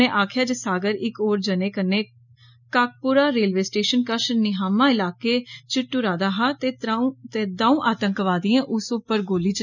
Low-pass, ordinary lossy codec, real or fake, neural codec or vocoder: 7.2 kHz; none; real; none